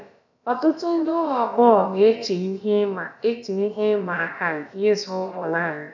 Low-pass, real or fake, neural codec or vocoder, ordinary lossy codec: 7.2 kHz; fake; codec, 16 kHz, about 1 kbps, DyCAST, with the encoder's durations; none